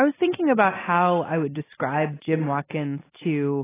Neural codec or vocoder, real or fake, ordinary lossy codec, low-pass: none; real; AAC, 16 kbps; 3.6 kHz